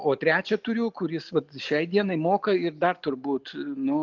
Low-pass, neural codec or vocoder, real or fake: 7.2 kHz; none; real